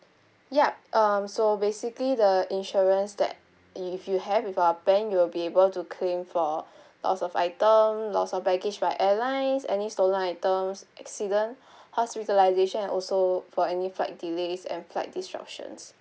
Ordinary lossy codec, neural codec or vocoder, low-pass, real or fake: none; none; none; real